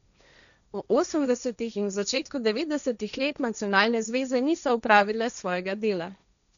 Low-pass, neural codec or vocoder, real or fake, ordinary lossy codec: 7.2 kHz; codec, 16 kHz, 1.1 kbps, Voila-Tokenizer; fake; none